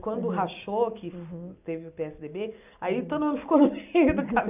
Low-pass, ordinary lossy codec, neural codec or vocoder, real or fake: 3.6 kHz; none; none; real